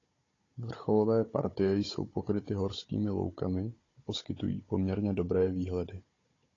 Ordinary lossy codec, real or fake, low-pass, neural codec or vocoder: AAC, 32 kbps; fake; 7.2 kHz; codec, 16 kHz, 16 kbps, FunCodec, trained on Chinese and English, 50 frames a second